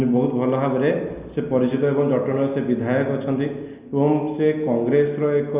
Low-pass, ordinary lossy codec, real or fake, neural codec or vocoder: 3.6 kHz; Opus, 64 kbps; real; none